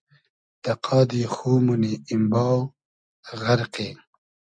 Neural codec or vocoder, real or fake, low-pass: none; real; 9.9 kHz